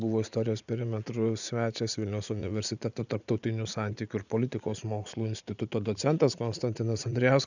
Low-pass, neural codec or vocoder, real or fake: 7.2 kHz; vocoder, 44.1 kHz, 80 mel bands, Vocos; fake